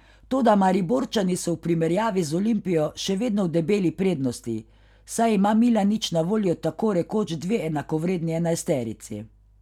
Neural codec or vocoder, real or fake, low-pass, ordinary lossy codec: none; real; 19.8 kHz; none